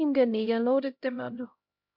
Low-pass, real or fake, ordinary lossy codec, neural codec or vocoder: 5.4 kHz; fake; MP3, 48 kbps; codec, 16 kHz, 0.5 kbps, X-Codec, HuBERT features, trained on LibriSpeech